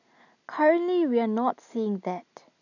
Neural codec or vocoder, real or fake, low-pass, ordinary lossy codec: none; real; 7.2 kHz; none